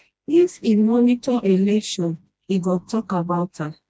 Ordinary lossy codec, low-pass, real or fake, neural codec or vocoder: none; none; fake; codec, 16 kHz, 1 kbps, FreqCodec, smaller model